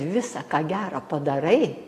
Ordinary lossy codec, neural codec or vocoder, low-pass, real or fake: AAC, 48 kbps; none; 14.4 kHz; real